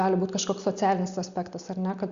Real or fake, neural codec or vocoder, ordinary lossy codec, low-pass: real; none; Opus, 64 kbps; 7.2 kHz